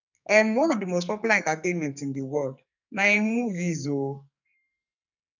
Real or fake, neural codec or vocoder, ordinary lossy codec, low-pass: fake; codec, 44.1 kHz, 2.6 kbps, SNAC; none; 7.2 kHz